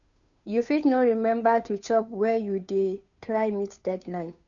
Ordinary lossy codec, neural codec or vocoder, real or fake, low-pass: none; codec, 16 kHz, 2 kbps, FunCodec, trained on Chinese and English, 25 frames a second; fake; 7.2 kHz